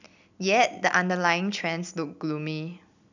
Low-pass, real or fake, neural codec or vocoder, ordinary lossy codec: 7.2 kHz; real; none; none